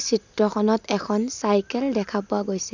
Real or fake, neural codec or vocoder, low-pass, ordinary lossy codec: real; none; 7.2 kHz; none